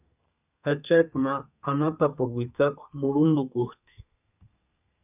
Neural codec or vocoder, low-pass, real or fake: codec, 32 kHz, 1.9 kbps, SNAC; 3.6 kHz; fake